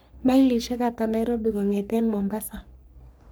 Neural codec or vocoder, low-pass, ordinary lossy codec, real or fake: codec, 44.1 kHz, 3.4 kbps, Pupu-Codec; none; none; fake